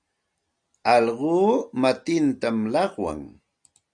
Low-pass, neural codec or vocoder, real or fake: 9.9 kHz; none; real